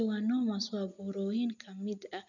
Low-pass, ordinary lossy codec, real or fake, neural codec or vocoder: 7.2 kHz; none; real; none